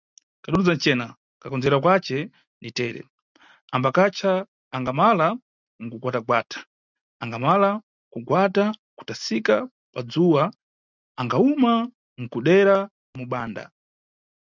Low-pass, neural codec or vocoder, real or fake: 7.2 kHz; none; real